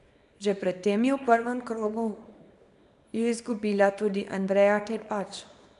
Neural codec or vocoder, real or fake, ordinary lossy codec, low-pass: codec, 24 kHz, 0.9 kbps, WavTokenizer, small release; fake; MP3, 96 kbps; 10.8 kHz